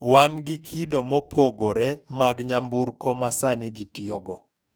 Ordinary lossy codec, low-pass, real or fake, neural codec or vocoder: none; none; fake; codec, 44.1 kHz, 2.6 kbps, DAC